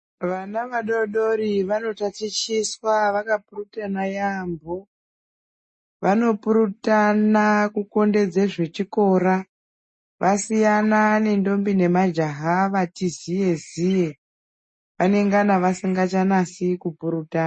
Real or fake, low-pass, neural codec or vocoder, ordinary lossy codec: real; 10.8 kHz; none; MP3, 32 kbps